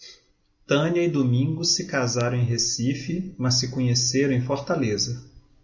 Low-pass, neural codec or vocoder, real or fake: 7.2 kHz; none; real